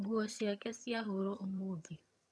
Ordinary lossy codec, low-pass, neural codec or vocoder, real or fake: none; none; vocoder, 22.05 kHz, 80 mel bands, HiFi-GAN; fake